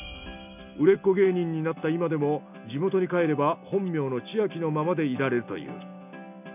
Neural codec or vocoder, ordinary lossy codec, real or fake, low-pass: none; none; real; 3.6 kHz